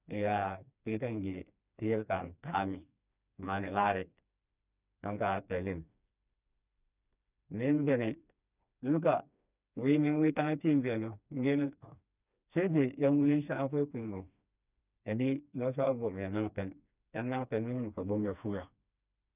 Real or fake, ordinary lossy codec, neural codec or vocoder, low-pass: fake; none; codec, 16 kHz, 2 kbps, FreqCodec, smaller model; 3.6 kHz